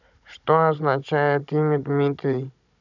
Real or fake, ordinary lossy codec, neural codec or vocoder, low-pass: fake; none; codec, 16 kHz, 16 kbps, FunCodec, trained on Chinese and English, 50 frames a second; 7.2 kHz